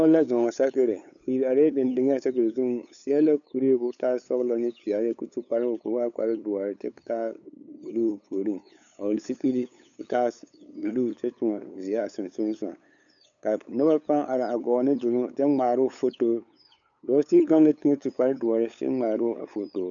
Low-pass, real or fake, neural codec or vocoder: 7.2 kHz; fake; codec, 16 kHz, 8 kbps, FunCodec, trained on LibriTTS, 25 frames a second